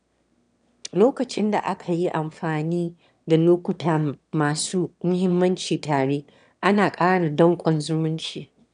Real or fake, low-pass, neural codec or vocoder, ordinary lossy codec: fake; 9.9 kHz; autoencoder, 22.05 kHz, a latent of 192 numbers a frame, VITS, trained on one speaker; none